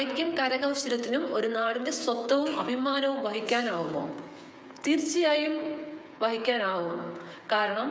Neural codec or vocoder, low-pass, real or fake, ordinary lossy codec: codec, 16 kHz, 8 kbps, FreqCodec, smaller model; none; fake; none